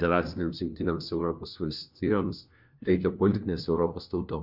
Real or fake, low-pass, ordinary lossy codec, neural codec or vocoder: fake; 5.4 kHz; Opus, 64 kbps; codec, 16 kHz, 1 kbps, FunCodec, trained on LibriTTS, 50 frames a second